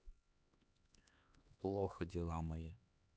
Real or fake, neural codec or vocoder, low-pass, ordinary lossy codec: fake; codec, 16 kHz, 2 kbps, X-Codec, HuBERT features, trained on LibriSpeech; none; none